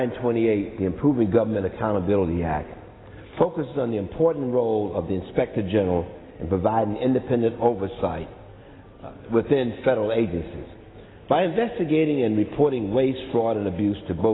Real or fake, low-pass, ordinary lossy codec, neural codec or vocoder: real; 7.2 kHz; AAC, 16 kbps; none